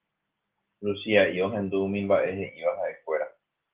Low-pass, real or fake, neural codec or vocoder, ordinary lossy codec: 3.6 kHz; real; none; Opus, 16 kbps